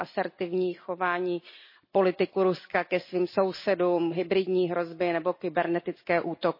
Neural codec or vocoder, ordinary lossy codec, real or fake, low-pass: none; MP3, 24 kbps; real; 5.4 kHz